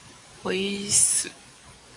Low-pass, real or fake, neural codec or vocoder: 10.8 kHz; fake; codec, 44.1 kHz, 7.8 kbps, DAC